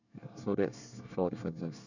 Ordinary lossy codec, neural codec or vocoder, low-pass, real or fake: none; codec, 24 kHz, 1 kbps, SNAC; 7.2 kHz; fake